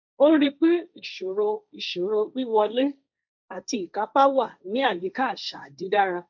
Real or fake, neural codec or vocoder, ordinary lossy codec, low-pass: fake; codec, 16 kHz, 1.1 kbps, Voila-Tokenizer; none; 7.2 kHz